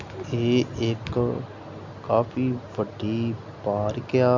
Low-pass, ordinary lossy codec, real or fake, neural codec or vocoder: 7.2 kHz; MP3, 64 kbps; real; none